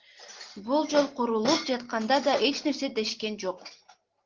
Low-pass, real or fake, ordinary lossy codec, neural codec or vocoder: 7.2 kHz; real; Opus, 16 kbps; none